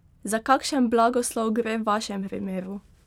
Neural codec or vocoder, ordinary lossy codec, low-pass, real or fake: none; none; 19.8 kHz; real